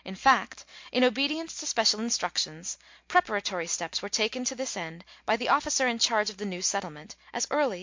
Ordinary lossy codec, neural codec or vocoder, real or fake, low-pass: MP3, 48 kbps; none; real; 7.2 kHz